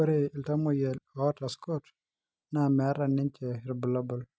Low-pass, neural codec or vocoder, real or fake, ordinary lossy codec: none; none; real; none